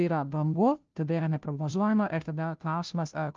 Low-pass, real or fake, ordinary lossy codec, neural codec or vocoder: 7.2 kHz; fake; Opus, 24 kbps; codec, 16 kHz, 0.5 kbps, FunCodec, trained on Chinese and English, 25 frames a second